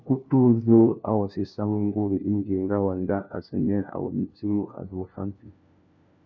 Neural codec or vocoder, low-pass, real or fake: codec, 16 kHz, 1 kbps, FunCodec, trained on LibriTTS, 50 frames a second; 7.2 kHz; fake